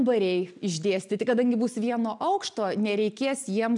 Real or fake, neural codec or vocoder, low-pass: real; none; 10.8 kHz